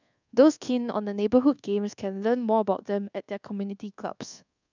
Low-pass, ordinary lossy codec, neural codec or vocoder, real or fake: 7.2 kHz; none; codec, 24 kHz, 1.2 kbps, DualCodec; fake